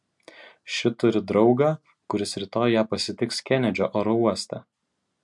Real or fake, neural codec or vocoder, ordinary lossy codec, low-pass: real; none; MP3, 64 kbps; 10.8 kHz